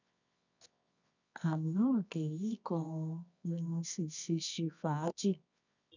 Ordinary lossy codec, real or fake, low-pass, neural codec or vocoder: none; fake; 7.2 kHz; codec, 24 kHz, 0.9 kbps, WavTokenizer, medium music audio release